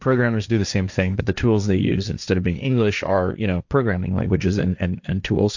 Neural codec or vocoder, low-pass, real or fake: codec, 16 kHz, 1.1 kbps, Voila-Tokenizer; 7.2 kHz; fake